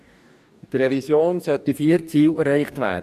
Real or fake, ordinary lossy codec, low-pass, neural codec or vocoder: fake; none; 14.4 kHz; codec, 44.1 kHz, 2.6 kbps, DAC